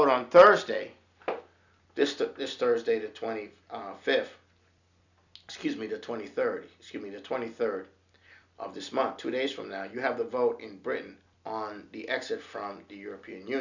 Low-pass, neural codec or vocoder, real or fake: 7.2 kHz; none; real